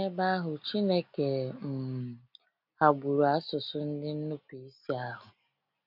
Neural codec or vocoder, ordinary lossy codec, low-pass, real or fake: none; none; 5.4 kHz; real